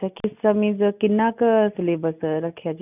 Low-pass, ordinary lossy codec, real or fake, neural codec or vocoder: 3.6 kHz; none; real; none